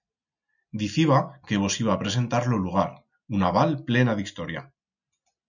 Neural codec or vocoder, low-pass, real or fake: none; 7.2 kHz; real